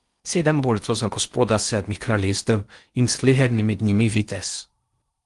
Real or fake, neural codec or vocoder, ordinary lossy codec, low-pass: fake; codec, 16 kHz in and 24 kHz out, 0.6 kbps, FocalCodec, streaming, 4096 codes; Opus, 32 kbps; 10.8 kHz